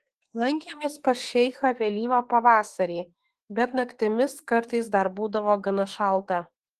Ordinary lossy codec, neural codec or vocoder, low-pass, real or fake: Opus, 16 kbps; autoencoder, 48 kHz, 32 numbers a frame, DAC-VAE, trained on Japanese speech; 14.4 kHz; fake